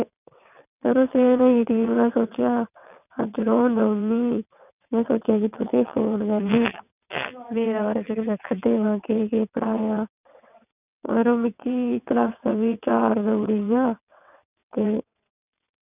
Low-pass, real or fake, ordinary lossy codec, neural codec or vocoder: 3.6 kHz; fake; none; vocoder, 22.05 kHz, 80 mel bands, WaveNeXt